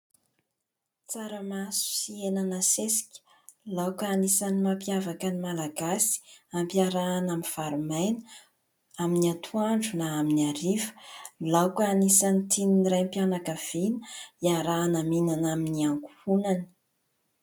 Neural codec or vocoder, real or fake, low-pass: none; real; 19.8 kHz